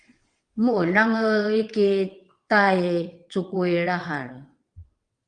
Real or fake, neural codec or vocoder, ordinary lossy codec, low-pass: fake; vocoder, 22.05 kHz, 80 mel bands, WaveNeXt; Opus, 32 kbps; 9.9 kHz